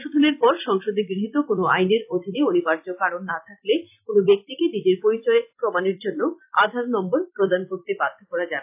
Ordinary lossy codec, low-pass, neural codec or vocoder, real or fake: AAC, 32 kbps; 3.6 kHz; vocoder, 44.1 kHz, 128 mel bands every 256 samples, BigVGAN v2; fake